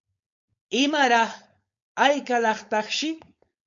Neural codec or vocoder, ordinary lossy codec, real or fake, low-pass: codec, 16 kHz, 4.8 kbps, FACodec; MP3, 48 kbps; fake; 7.2 kHz